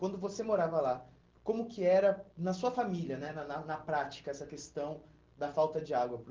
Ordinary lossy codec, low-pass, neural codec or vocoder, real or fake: Opus, 16 kbps; 7.2 kHz; none; real